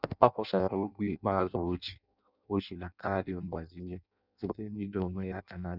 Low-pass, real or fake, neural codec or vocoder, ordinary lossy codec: 5.4 kHz; fake; codec, 16 kHz in and 24 kHz out, 0.6 kbps, FireRedTTS-2 codec; none